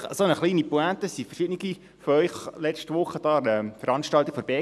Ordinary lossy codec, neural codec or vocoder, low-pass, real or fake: none; none; none; real